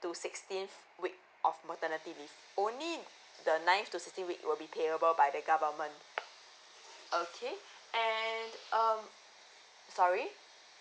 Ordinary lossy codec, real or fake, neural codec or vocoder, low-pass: none; real; none; none